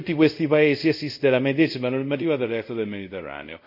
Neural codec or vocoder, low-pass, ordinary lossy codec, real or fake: codec, 24 kHz, 0.5 kbps, DualCodec; 5.4 kHz; none; fake